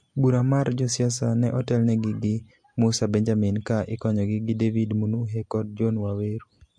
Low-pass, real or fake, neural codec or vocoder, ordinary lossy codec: 9.9 kHz; real; none; MP3, 48 kbps